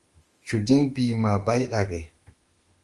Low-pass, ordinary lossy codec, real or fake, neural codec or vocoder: 10.8 kHz; Opus, 24 kbps; fake; autoencoder, 48 kHz, 32 numbers a frame, DAC-VAE, trained on Japanese speech